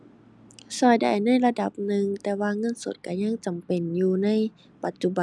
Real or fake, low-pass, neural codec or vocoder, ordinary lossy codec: real; none; none; none